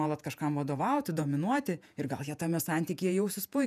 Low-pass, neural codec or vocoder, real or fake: 14.4 kHz; vocoder, 48 kHz, 128 mel bands, Vocos; fake